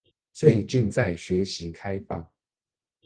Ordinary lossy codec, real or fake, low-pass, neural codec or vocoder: Opus, 16 kbps; fake; 9.9 kHz; codec, 24 kHz, 0.9 kbps, WavTokenizer, medium music audio release